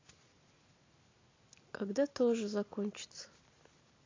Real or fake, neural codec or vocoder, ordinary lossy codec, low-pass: real; none; AAC, 32 kbps; 7.2 kHz